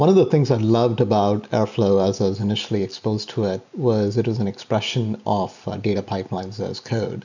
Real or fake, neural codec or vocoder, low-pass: real; none; 7.2 kHz